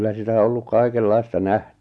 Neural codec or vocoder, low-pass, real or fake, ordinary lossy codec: none; none; real; none